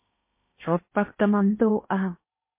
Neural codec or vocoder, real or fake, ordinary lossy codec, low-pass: codec, 16 kHz in and 24 kHz out, 0.8 kbps, FocalCodec, streaming, 65536 codes; fake; MP3, 24 kbps; 3.6 kHz